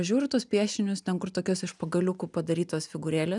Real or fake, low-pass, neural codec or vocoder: real; 10.8 kHz; none